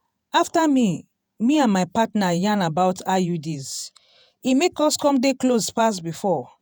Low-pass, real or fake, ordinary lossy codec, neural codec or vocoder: none; fake; none; vocoder, 48 kHz, 128 mel bands, Vocos